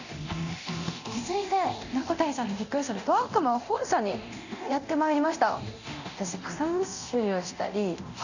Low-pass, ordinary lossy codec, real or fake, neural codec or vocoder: 7.2 kHz; none; fake; codec, 24 kHz, 0.9 kbps, DualCodec